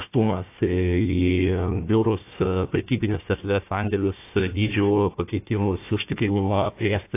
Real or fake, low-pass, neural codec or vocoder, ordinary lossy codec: fake; 3.6 kHz; codec, 16 kHz, 1 kbps, FunCodec, trained on Chinese and English, 50 frames a second; AAC, 24 kbps